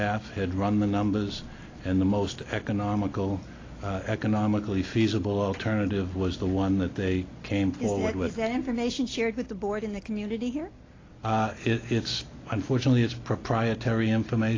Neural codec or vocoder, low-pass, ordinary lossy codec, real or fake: none; 7.2 kHz; AAC, 32 kbps; real